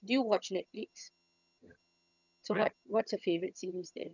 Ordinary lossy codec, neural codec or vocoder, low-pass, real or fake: none; vocoder, 22.05 kHz, 80 mel bands, HiFi-GAN; 7.2 kHz; fake